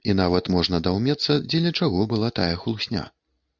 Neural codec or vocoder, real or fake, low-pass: none; real; 7.2 kHz